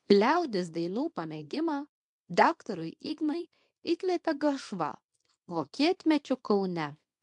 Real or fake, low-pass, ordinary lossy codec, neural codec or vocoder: fake; 10.8 kHz; MP3, 64 kbps; codec, 24 kHz, 0.9 kbps, WavTokenizer, small release